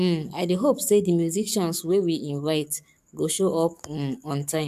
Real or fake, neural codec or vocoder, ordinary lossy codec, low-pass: fake; codec, 44.1 kHz, 7.8 kbps, DAC; MP3, 96 kbps; 14.4 kHz